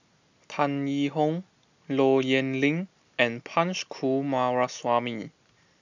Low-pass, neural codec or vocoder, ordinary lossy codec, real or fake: 7.2 kHz; none; none; real